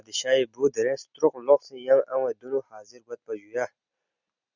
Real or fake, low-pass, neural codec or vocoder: real; 7.2 kHz; none